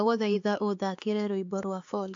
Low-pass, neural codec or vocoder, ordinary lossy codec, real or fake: 7.2 kHz; codec, 16 kHz, 4 kbps, X-Codec, HuBERT features, trained on LibriSpeech; AAC, 48 kbps; fake